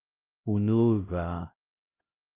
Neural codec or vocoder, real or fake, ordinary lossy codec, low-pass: codec, 16 kHz, 0.5 kbps, X-Codec, HuBERT features, trained on LibriSpeech; fake; Opus, 24 kbps; 3.6 kHz